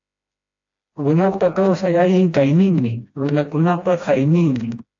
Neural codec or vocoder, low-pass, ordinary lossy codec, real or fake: codec, 16 kHz, 1 kbps, FreqCodec, smaller model; 7.2 kHz; AAC, 48 kbps; fake